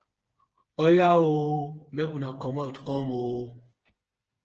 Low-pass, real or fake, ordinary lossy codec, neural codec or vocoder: 7.2 kHz; fake; Opus, 16 kbps; codec, 16 kHz, 4 kbps, FreqCodec, smaller model